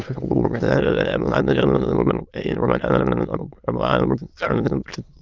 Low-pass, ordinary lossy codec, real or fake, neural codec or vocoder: 7.2 kHz; Opus, 24 kbps; fake; autoencoder, 22.05 kHz, a latent of 192 numbers a frame, VITS, trained on many speakers